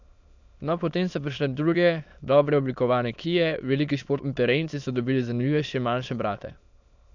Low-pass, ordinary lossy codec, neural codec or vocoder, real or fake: 7.2 kHz; none; autoencoder, 22.05 kHz, a latent of 192 numbers a frame, VITS, trained on many speakers; fake